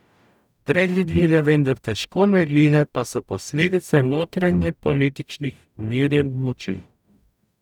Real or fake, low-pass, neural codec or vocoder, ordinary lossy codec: fake; 19.8 kHz; codec, 44.1 kHz, 0.9 kbps, DAC; none